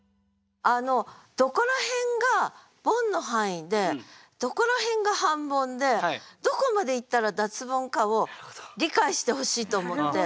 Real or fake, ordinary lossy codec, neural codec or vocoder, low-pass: real; none; none; none